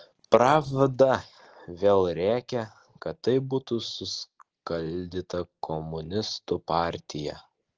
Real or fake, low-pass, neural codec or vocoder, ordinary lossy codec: real; 7.2 kHz; none; Opus, 16 kbps